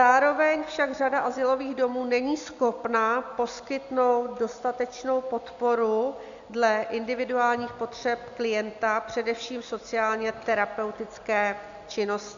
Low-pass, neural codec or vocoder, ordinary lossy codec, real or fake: 7.2 kHz; none; AAC, 96 kbps; real